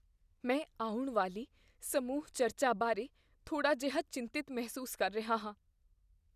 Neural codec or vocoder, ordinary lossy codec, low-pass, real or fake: none; none; 14.4 kHz; real